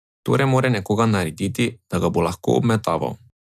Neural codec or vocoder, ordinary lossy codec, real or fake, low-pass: none; none; real; 14.4 kHz